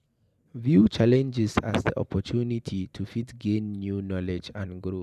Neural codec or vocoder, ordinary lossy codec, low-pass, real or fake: vocoder, 44.1 kHz, 128 mel bands every 512 samples, BigVGAN v2; none; 14.4 kHz; fake